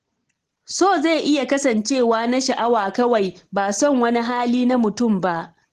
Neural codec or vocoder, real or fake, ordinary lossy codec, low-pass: none; real; Opus, 16 kbps; 14.4 kHz